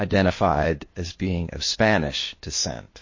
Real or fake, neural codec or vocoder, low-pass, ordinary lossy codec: fake; codec, 16 kHz, 0.8 kbps, ZipCodec; 7.2 kHz; MP3, 32 kbps